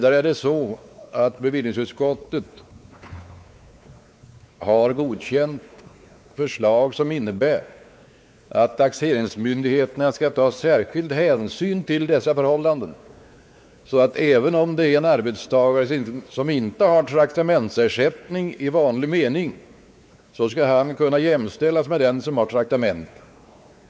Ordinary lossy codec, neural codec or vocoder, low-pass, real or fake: none; codec, 16 kHz, 4 kbps, X-Codec, WavLM features, trained on Multilingual LibriSpeech; none; fake